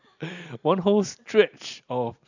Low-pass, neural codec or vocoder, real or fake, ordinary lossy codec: 7.2 kHz; none; real; none